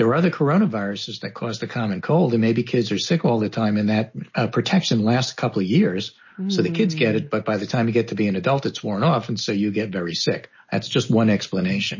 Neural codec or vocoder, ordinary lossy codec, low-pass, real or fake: none; MP3, 32 kbps; 7.2 kHz; real